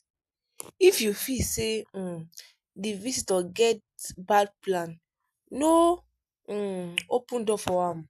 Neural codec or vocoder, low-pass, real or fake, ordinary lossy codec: none; 14.4 kHz; real; none